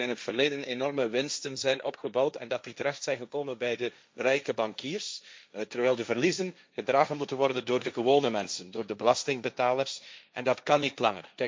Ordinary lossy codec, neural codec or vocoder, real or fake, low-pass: none; codec, 16 kHz, 1.1 kbps, Voila-Tokenizer; fake; none